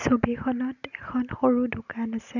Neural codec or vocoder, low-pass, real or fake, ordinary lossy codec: none; 7.2 kHz; real; none